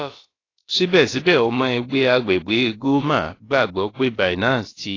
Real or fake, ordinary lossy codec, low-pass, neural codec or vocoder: fake; AAC, 32 kbps; 7.2 kHz; codec, 16 kHz, about 1 kbps, DyCAST, with the encoder's durations